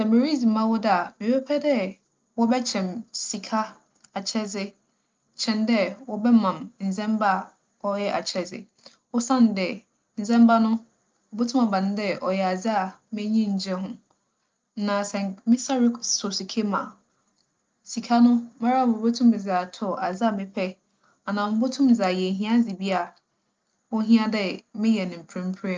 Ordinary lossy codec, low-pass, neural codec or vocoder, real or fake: Opus, 24 kbps; 7.2 kHz; none; real